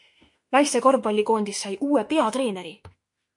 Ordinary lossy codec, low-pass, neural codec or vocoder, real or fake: MP3, 48 kbps; 10.8 kHz; autoencoder, 48 kHz, 32 numbers a frame, DAC-VAE, trained on Japanese speech; fake